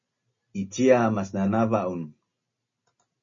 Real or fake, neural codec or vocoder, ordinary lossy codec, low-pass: real; none; MP3, 32 kbps; 7.2 kHz